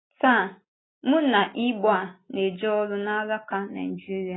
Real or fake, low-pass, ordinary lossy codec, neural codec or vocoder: real; 7.2 kHz; AAC, 16 kbps; none